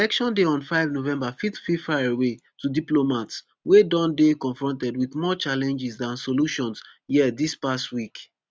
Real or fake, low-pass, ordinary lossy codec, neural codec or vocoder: real; none; none; none